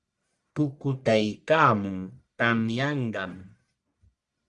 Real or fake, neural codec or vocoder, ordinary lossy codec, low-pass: fake; codec, 44.1 kHz, 1.7 kbps, Pupu-Codec; Opus, 64 kbps; 10.8 kHz